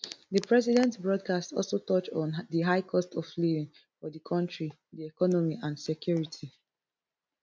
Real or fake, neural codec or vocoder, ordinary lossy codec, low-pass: real; none; none; none